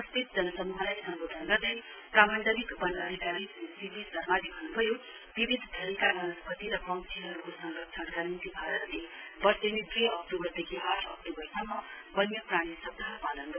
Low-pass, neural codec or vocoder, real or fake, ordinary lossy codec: 3.6 kHz; none; real; none